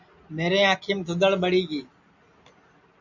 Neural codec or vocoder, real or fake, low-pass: none; real; 7.2 kHz